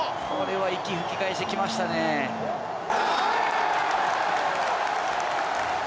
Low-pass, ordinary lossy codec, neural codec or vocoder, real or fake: none; none; none; real